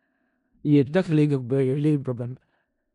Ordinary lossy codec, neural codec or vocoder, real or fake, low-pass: none; codec, 16 kHz in and 24 kHz out, 0.4 kbps, LongCat-Audio-Codec, four codebook decoder; fake; 10.8 kHz